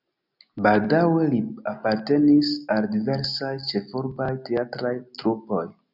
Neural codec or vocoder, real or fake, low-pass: none; real; 5.4 kHz